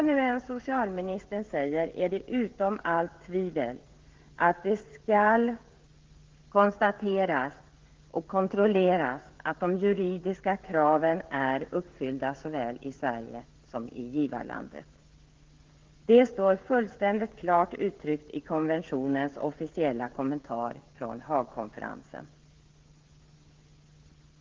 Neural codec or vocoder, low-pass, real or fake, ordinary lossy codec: codec, 16 kHz, 16 kbps, FreqCodec, smaller model; 7.2 kHz; fake; Opus, 16 kbps